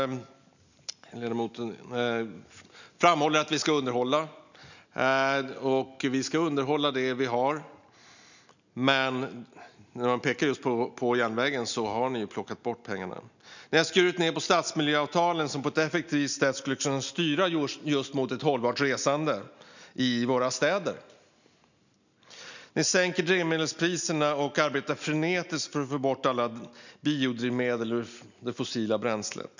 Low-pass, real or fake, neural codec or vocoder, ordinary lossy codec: 7.2 kHz; real; none; none